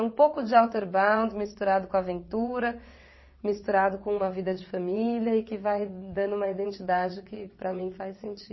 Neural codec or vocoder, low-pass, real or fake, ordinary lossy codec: vocoder, 22.05 kHz, 80 mel bands, WaveNeXt; 7.2 kHz; fake; MP3, 24 kbps